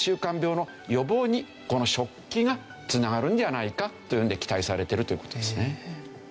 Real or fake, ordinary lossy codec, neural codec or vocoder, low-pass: real; none; none; none